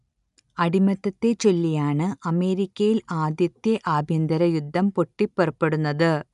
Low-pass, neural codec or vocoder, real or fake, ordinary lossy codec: 10.8 kHz; none; real; none